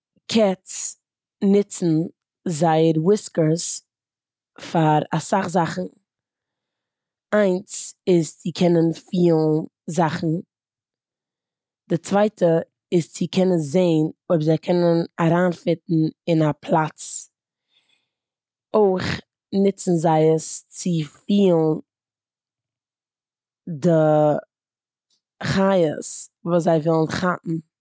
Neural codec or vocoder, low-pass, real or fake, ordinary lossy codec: none; none; real; none